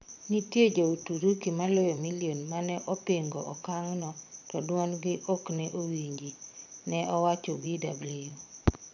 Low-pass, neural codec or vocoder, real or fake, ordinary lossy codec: 7.2 kHz; vocoder, 22.05 kHz, 80 mel bands, Vocos; fake; none